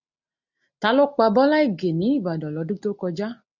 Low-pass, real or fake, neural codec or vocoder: 7.2 kHz; real; none